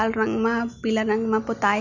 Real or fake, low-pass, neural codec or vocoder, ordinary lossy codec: real; 7.2 kHz; none; none